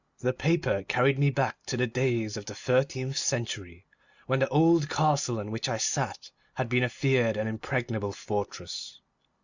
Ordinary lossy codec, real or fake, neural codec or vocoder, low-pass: Opus, 64 kbps; real; none; 7.2 kHz